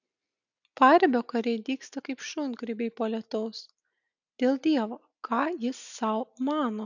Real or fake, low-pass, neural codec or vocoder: real; 7.2 kHz; none